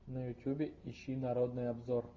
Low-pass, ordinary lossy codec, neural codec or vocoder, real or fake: 7.2 kHz; Opus, 32 kbps; none; real